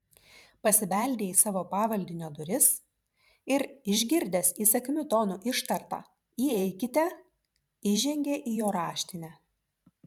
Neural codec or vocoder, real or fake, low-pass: vocoder, 44.1 kHz, 128 mel bands every 512 samples, BigVGAN v2; fake; 19.8 kHz